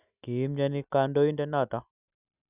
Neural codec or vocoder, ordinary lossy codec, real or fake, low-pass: none; none; real; 3.6 kHz